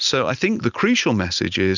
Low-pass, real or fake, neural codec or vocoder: 7.2 kHz; real; none